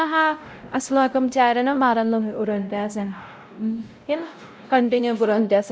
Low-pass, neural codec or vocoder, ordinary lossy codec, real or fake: none; codec, 16 kHz, 0.5 kbps, X-Codec, WavLM features, trained on Multilingual LibriSpeech; none; fake